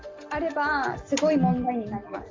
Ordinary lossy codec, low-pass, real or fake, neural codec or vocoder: Opus, 32 kbps; 7.2 kHz; real; none